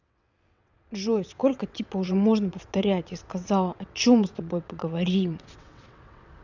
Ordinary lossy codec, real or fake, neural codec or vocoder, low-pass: none; fake; vocoder, 22.05 kHz, 80 mel bands, WaveNeXt; 7.2 kHz